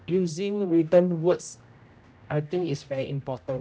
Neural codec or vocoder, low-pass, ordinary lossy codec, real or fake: codec, 16 kHz, 0.5 kbps, X-Codec, HuBERT features, trained on general audio; none; none; fake